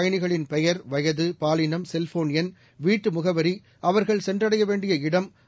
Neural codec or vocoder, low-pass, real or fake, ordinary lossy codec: none; none; real; none